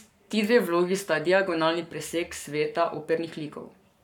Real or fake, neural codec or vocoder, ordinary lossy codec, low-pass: fake; codec, 44.1 kHz, 7.8 kbps, Pupu-Codec; none; 19.8 kHz